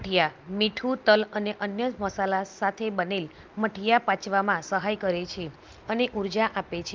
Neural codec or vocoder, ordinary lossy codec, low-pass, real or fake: none; Opus, 24 kbps; 7.2 kHz; real